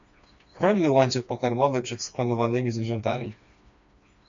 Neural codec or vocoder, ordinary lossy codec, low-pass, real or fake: codec, 16 kHz, 2 kbps, FreqCodec, smaller model; MP3, 64 kbps; 7.2 kHz; fake